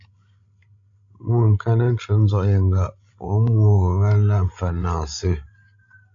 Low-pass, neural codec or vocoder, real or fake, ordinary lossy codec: 7.2 kHz; codec, 16 kHz, 16 kbps, FreqCodec, larger model; fake; AAC, 64 kbps